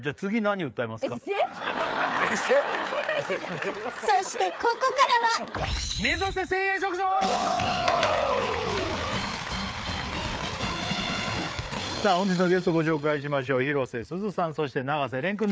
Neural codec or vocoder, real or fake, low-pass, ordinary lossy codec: codec, 16 kHz, 4 kbps, FreqCodec, larger model; fake; none; none